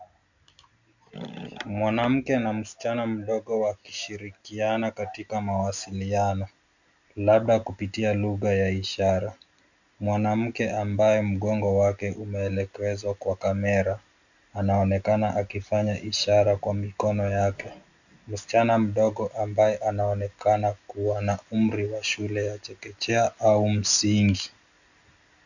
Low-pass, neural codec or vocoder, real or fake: 7.2 kHz; none; real